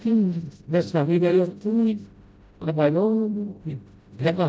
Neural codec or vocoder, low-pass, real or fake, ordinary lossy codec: codec, 16 kHz, 0.5 kbps, FreqCodec, smaller model; none; fake; none